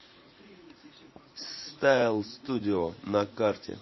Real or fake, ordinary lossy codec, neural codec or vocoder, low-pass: fake; MP3, 24 kbps; vocoder, 44.1 kHz, 80 mel bands, Vocos; 7.2 kHz